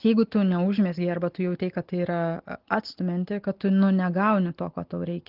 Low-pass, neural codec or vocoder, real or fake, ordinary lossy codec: 5.4 kHz; none; real; Opus, 16 kbps